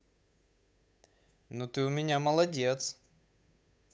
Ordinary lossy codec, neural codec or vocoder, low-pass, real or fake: none; codec, 16 kHz, 8 kbps, FunCodec, trained on Chinese and English, 25 frames a second; none; fake